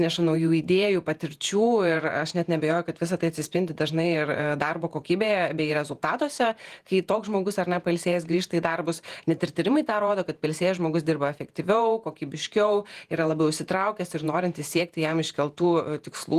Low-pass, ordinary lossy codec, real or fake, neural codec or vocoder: 14.4 kHz; Opus, 24 kbps; fake; vocoder, 48 kHz, 128 mel bands, Vocos